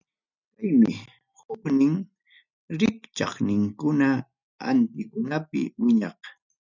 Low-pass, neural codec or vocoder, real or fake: 7.2 kHz; none; real